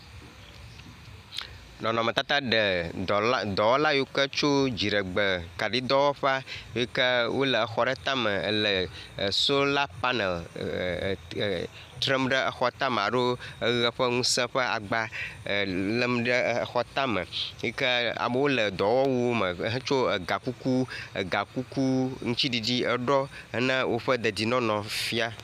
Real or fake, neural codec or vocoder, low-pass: real; none; 14.4 kHz